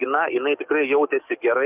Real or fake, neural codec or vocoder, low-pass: fake; codec, 44.1 kHz, 7.8 kbps, DAC; 3.6 kHz